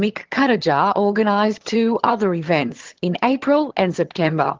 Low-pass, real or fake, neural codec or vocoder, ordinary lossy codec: 7.2 kHz; fake; vocoder, 22.05 kHz, 80 mel bands, HiFi-GAN; Opus, 16 kbps